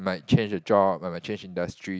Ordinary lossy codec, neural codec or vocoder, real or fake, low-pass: none; none; real; none